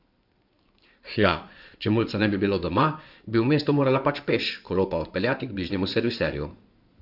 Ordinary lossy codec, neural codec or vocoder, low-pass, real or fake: none; codec, 16 kHz in and 24 kHz out, 2.2 kbps, FireRedTTS-2 codec; 5.4 kHz; fake